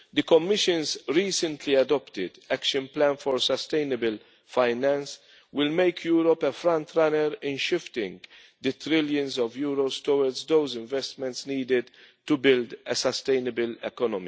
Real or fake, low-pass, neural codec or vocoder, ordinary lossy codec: real; none; none; none